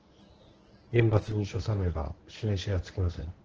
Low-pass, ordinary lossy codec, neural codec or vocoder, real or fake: 7.2 kHz; Opus, 16 kbps; codec, 24 kHz, 0.9 kbps, WavTokenizer, medium speech release version 1; fake